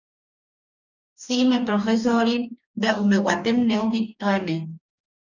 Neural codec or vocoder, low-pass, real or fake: codec, 44.1 kHz, 2.6 kbps, DAC; 7.2 kHz; fake